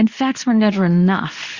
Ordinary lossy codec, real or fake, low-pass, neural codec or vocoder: Opus, 64 kbps; fake; 7.2 kHz; codec, 24 kHz, 0.9 kbps, WavTokenizer, medium speech release version 2